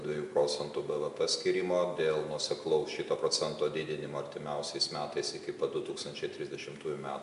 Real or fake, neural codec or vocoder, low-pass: real; none; 10.8 kHz